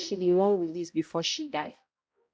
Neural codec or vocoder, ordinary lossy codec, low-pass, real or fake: codec, 16 kHz, 0.5 kbps, X-Codec, HuBERT features, trained on balanced general audio; none; none; fake